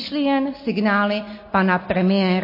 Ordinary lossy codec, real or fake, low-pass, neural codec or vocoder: MP3, 24 kbps; real; 5.4 kHz; none